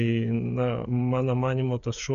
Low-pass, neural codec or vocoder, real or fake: 7.2 kHz; codec, 16 kHz, 8 kbps, FreqCodec, smaller model; fake